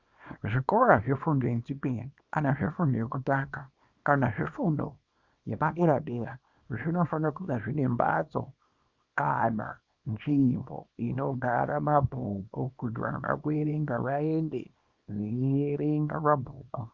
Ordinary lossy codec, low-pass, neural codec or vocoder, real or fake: Opus, 64 kbps; 7.2 kHz; codec, 24 kHz, 0.9 kbps, WavTokenizer, small release; fake